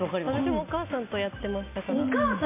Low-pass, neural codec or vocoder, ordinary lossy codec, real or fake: 3.6 kHz; none; MP3, 24 kbps; real